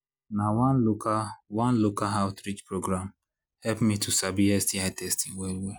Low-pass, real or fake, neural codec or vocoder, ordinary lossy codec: none; real; none; none